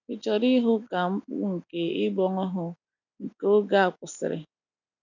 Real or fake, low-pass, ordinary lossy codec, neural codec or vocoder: real; 7.2 kHz; none; none